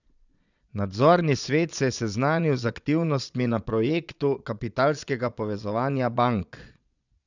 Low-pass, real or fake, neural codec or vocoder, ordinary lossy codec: 7.2 kHz; fake; vocoder, 22.05 kHz, 80 mel bands, Vocos; none